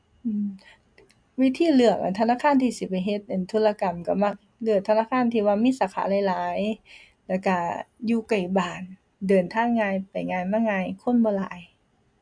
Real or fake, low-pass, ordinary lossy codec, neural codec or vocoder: real; 9.9 kHz; MP3, 64 kbps; none